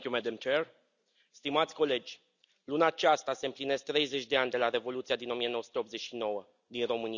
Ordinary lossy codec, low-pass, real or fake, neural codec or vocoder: none; 7.2 kHz; real; none